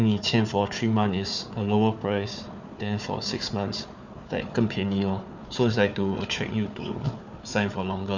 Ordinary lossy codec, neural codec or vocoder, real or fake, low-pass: none; codec, 16 kHz, 4 kbps, FunCodec, trained on Chinese and English, 50 frames a second; fake; 7.2 kHz